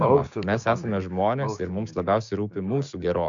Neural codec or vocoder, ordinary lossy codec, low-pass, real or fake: codec, 16 kHz, 6 kbps, DAC; AAC, 64 kbps; 7.2 kHz; fake